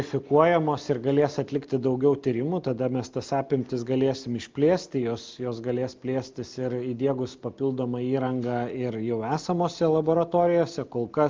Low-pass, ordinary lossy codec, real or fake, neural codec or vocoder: 7.2 kHz; Opus, 24 kbps; real; none